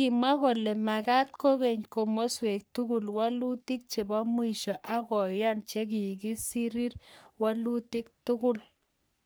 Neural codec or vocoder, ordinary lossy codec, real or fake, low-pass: codec, 44.1 kHz, 3.4 kbps, Pupu-Codec; none; fake; none